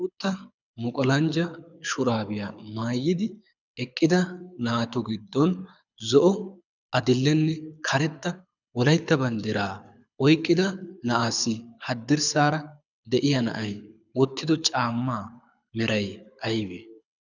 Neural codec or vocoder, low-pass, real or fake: codec, 24 kHz, 6 kbps, HILCodec; 7.2 kHz; fake